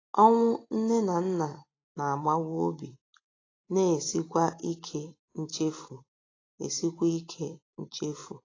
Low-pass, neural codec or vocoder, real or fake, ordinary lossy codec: 7.2 kHz; none; real; AAC, 32 kbps